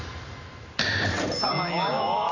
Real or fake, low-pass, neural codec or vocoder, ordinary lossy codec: real; 7.2 kHz; none; none